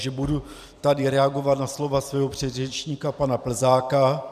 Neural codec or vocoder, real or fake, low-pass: vocoder, 44.1 kHz, 128 mel bands every 256 samples, BigVGAN v2; fake; 14.4 kHz